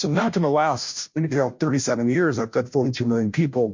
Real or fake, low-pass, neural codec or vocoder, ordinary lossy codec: fake; 7.2 kHz; codec, 16 kHz, 0.5 kbps, FunCodec, trained on Chinese and English, 25 frames a second; MP3, 48 kbps